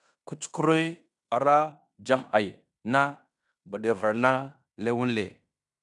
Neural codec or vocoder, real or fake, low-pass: codec, 16 kHz in and 24 kHz out, 0.9 kbps, LongCat-Audio-Codec, fine tuned four codebook decoder; fake; 10.8 kHz